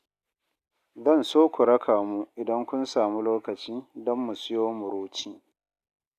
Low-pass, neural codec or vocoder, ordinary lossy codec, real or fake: 14.4 kHz; none; Opus, 64 kbps; real